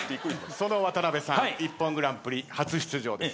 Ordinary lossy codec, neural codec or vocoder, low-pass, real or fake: none; none; none; real